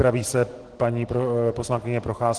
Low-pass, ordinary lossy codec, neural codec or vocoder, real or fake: 10.8 kHz; Opus, 16 kbps; none; real